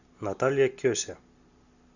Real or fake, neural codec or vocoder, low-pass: real; none; 7.2 kHz